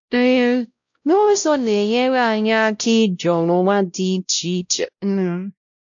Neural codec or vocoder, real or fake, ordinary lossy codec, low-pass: codec, 16 kHz, 0.5 kbps, X-Codec, WavLM features, trained on Multilingual LibriSpeech; fake; AAC, 64 kbps; 7.2 kHz